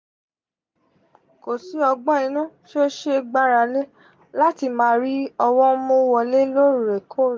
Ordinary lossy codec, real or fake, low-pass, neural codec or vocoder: none; real; none; none